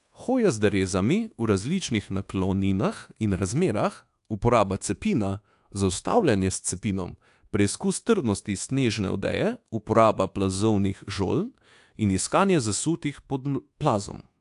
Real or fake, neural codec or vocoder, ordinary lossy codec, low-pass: fake; codec, 24 kHz, 1.2 kbps, DualCodec; AAC, 64 kbps; 10.8 kHz